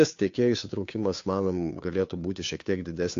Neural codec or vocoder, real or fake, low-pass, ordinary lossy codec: codec, 16 kHz, 2 kbps, FunCodec, trained on Chinese and English, 25 frames a second; fake; 7.2 kHz; AAC, 48 kbps